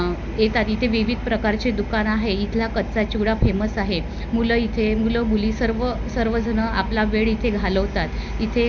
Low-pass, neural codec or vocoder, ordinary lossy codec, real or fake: 7.2 kHz; none; none; real